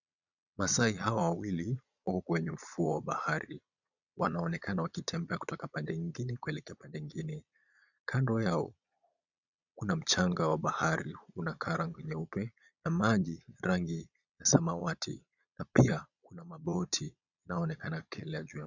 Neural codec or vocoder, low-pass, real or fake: vocoder, 22.05 kHz, 80 mel bands, Vocos; 7.2 kHz; fake